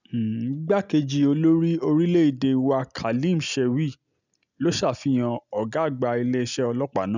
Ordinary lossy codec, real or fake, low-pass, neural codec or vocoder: none; real; 7.2 kHz; none